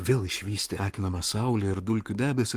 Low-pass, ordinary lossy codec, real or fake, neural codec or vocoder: 14.4 kHz; Opus, 24 kbps; fake; codec, 44.1 kHz, 7.8 kbps, Pupu-Codec